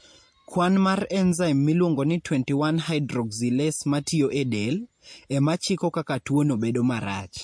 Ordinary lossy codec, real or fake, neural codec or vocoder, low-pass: MP3, 48 kbps; real; none; 9.9 kHz